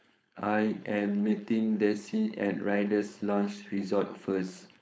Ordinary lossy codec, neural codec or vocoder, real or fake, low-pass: none; codec, 16 kHz, 4.8 kbps, FACodec; fake; none